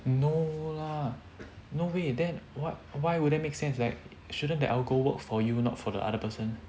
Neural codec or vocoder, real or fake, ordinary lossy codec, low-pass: none; real; none; none